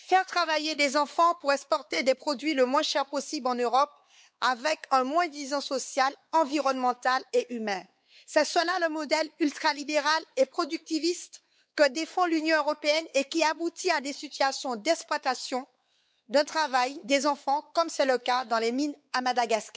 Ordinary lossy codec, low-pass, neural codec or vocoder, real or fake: none; none; codec, 16 kHz, 4 kbps, X-Codec, WavLM features, trained on Multilingual LibriSpeech; fake